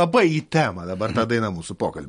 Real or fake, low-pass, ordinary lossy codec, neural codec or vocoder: real; 19.8 kHz; MP3, 48 kbps; none